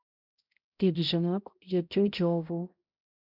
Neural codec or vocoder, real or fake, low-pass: codec, 16 kHz, 0.5 kbps, X-Codec, HuBERT features, trained on balanced general audio; fake; 5.4 kHz